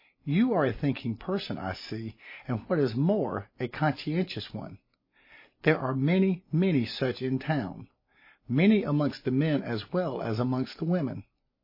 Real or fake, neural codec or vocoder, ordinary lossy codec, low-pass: real; none; MP3, 24 kbps; 5.4 kHz